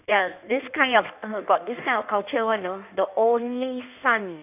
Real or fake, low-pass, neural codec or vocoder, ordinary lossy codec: fake; 3.6 kHz; codec, 16 kHz in and 24 kHz out, 1.1 kbps, FireRedTTS-2 codec; none